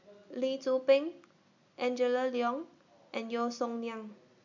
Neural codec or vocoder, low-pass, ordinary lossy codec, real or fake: none; 7.2 kHz; MP3, 64 kbps; real